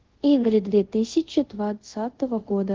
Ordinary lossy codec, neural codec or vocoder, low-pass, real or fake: Opus, 16 kbps; codec, 24 kHz, 0.5 kbps, DualCodec; 7.2 kHz; fake